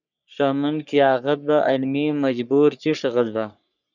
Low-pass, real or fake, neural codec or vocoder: 7.2 kHz; fake; codec, 44.1 kHz, 3.4 kbps, Pupu-Codec